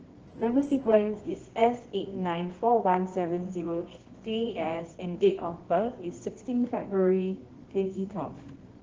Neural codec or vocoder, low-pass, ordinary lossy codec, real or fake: codec, 24 kHz, 0.9 kbps, WavTokenizer, medium music audio release; 7.2 kHz; Opus, 16 kbps; fake